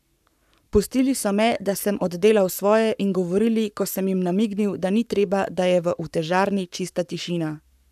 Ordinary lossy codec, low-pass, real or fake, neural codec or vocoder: none; 14.4 kHz; fake; codec, 44.1 kHz, 7.8 kbps, Pupu-Codec